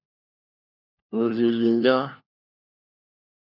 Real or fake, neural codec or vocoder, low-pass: fake; codec, 16 kHz, 1 kbps, FunCodec, trained on LibriTTS, 50 frames a second; 5.4 kHz